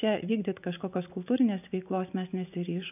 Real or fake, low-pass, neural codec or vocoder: fake; 3.6 kHz; vocoder, 24 kHz, 100 mel bands, Vocos